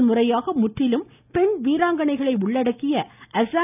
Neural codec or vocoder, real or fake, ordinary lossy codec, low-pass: none; real; none; 3.6 kHz